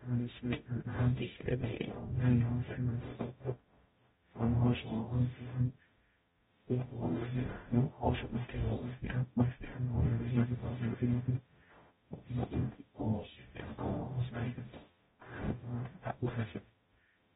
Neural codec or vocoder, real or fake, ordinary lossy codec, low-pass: codec, 44.1 kHz, 0.9 kbps, DAC; fake; AAC, 16 kbps; 19.8 kHz